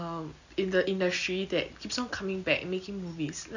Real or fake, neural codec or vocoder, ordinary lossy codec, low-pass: real; none; none; 7.2 kHz